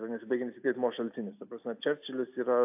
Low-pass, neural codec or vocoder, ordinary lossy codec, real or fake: 3.6 kHz; none; AAC, 32 kbps; real